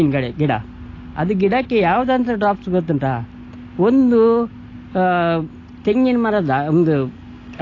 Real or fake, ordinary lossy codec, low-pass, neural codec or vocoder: real; AAC, 32 kbps; 7.2 kHz; none